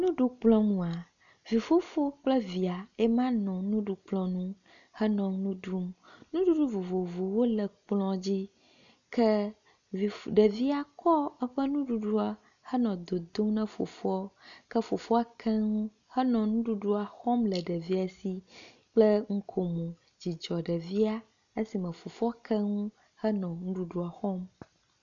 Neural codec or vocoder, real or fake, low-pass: none; real; 7.2 kHz